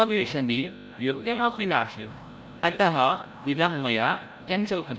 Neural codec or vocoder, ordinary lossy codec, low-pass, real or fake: codec, 16 kHz, 0.5 kbps, FreqCodec, larger model; none; none; fake